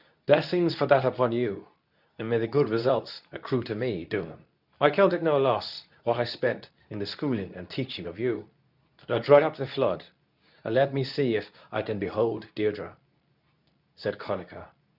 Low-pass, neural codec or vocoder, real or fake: 5.4 kHz; codec, 24 kHz, 0.9 kbps, WavTokenizer, medium speech release version 2; fake